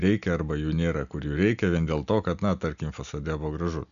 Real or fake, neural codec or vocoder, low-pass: real; none; 7.2 kHz